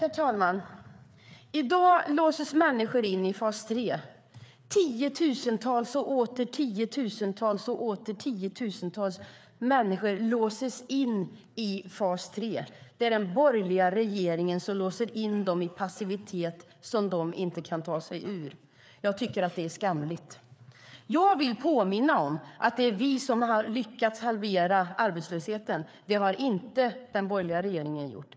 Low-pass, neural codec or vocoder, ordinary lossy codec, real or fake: none; codec, 16 kHz, 4 kbps, FreqCodec, larger model; none; fake